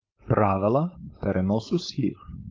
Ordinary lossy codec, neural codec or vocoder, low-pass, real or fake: Opus, 24 kbps; codec, 16 kHz, 4.8 kbps, FACodec; 7.2 kHz; fake